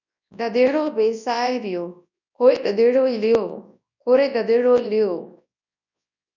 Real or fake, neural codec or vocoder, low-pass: fake; codec, 24 kHz, 0.9 kbps, WavTokenizer, large speech release; 7.2 kHz